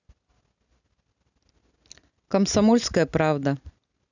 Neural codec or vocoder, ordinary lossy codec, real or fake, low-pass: none; none; real; 7.2 kHz